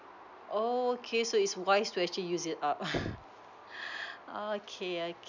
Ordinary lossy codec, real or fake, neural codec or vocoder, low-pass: none; real; none; 7.2 kHz